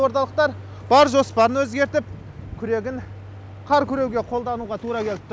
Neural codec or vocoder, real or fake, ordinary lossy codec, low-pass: none; real; none; none